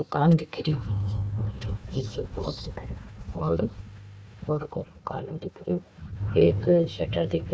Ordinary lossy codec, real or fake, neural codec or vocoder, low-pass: none; fake; codec, 16 kHz, 1 kbps, FunCodec, trained on Chinese and English, 50 frames a second; none